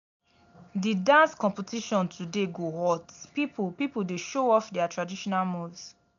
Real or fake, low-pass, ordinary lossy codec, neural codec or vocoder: real; 7.2 kHz; none; none